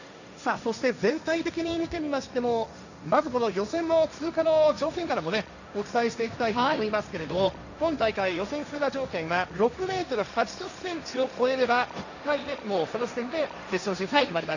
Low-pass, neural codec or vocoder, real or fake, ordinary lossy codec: 7.2 kHz; codec, 16 kHz, 1.1 kbps, Voila-Tokenizer; fake; none